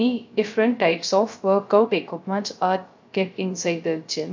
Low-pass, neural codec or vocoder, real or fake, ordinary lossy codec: 7.2 kHz; codec, 16 kHz, 0.3 kbps, FocalCodec; fake; MP3, 48 kbps